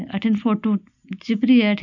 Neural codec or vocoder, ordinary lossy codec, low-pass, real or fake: none; none; 7.2 kHz; real